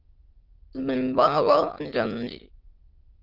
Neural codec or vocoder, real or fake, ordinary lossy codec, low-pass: autoencoder, 22.05 kHz, a latent of 192 numbers a frame, VITS, trained on many speakers; fake; Opus, 32 kbps; 5.4 kHz